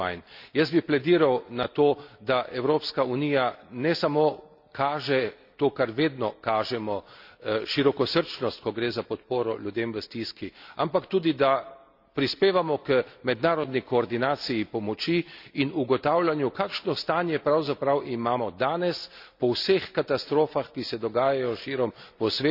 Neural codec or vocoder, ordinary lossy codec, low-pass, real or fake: none; none; 5.4 kHz; real